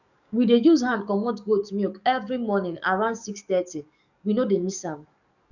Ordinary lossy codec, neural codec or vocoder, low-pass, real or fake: none; codec, 44.1 kHz, 7.8 kbps, DAC; 7.2 kHz; fake